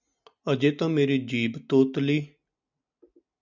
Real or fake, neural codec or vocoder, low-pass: real; none; 7.2 kHz